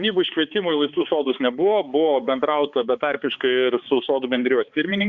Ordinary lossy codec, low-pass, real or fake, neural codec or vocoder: Opus, 64 kbps; 7.2 kHz; fake; codec, 16 kHz, 4 kbps, X-Codec, HuBERT features, trained on balanced general audio